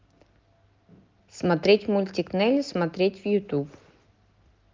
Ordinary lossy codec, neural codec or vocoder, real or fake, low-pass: Opus, 32 kbps; none; real; 7.2 kHz